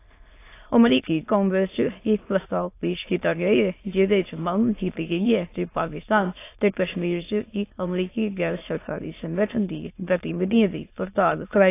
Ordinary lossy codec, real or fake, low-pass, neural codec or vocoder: AAC, 24 kbps; fake; 3.6 kHz; autoencoder, 22.05 kHz, a latent of 192 numbers a frame, VITS, trained on many speakers